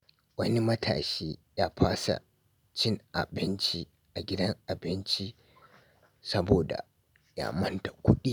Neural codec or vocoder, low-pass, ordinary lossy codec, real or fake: none; none; none; real